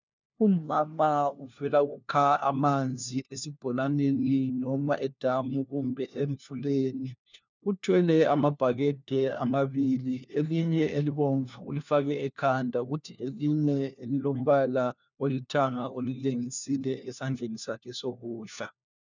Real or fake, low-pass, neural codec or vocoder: fake; 7.2 kHz; codec, 16 kHz, 1 kbps, FunCodec, trained on LibriTTS, 50 frames a second